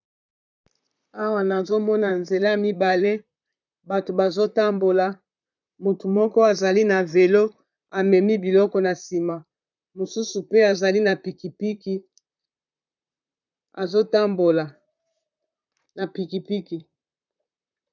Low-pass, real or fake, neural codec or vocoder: 7.2 kHz; fake; vocoder, 44.1 kHz, 128 mel bands, Pupu-Vocoder